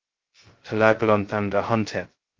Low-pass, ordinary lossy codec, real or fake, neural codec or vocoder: 7.2 kHz; Opus, 16 kbps; fake; codec, 16 kHz, 0.2 kbps, FocalCodec